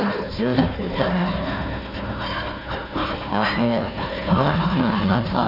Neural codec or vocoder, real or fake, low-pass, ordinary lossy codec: codec, 16 kHz, 1 kbps, FunCodec, trained on Chinese and English, 50 frames a second; fake; 5.4 kHz; none